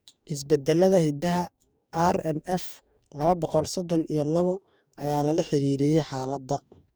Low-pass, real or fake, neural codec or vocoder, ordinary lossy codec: none; fake; codec, 44.1 kHz, 2.6 kbps, DAC; none